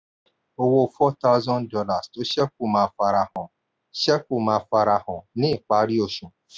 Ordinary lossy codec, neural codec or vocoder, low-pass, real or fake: none; none; none; real